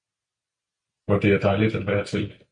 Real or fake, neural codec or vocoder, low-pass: real; none; 9.9 kHz